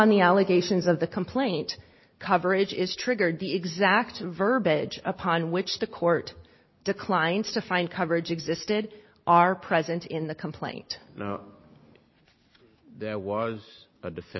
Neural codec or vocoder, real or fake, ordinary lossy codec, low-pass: none; real; MP3, 24 kbps; 7.2 kHz